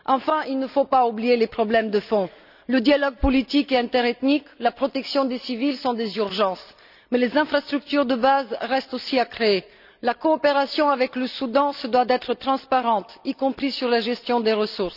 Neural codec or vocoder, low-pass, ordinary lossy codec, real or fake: none; 5.4 kHz; none; real